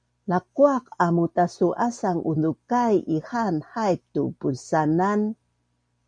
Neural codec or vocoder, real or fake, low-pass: none; real; 9.9 kHz